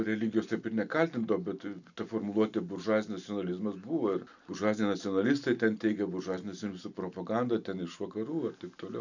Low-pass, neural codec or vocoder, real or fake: 7.2 kHz; none; real